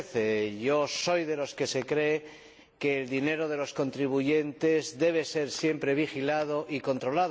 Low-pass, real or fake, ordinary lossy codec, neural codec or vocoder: none; real; none; none